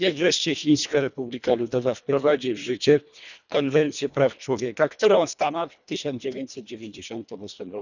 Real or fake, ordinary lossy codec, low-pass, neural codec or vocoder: fake; none; 7.2 kHz; codec, 24 kHz, 1.5 kbps, HILCodec